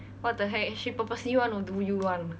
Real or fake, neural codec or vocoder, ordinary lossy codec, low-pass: real; none; none; none